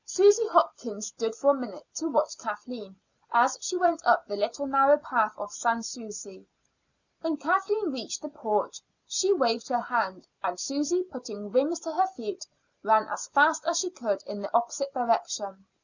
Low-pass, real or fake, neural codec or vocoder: 7.2 kHz; real; none